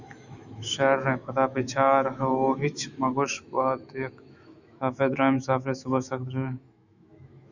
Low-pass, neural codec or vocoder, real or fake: 7.2 kHz; autoencoder, 48 kHz, 128 numbers a frame, DAC-VAE, trained on Japanese speech; fake